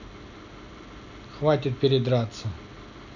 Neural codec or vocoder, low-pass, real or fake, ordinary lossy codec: none; 7.2 kHz; real; none